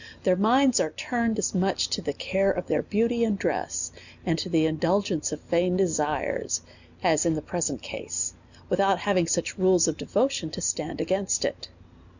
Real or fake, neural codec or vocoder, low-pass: real; none; 7.2 kHz